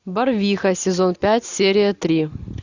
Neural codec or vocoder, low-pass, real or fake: none; 7.2 kHz; real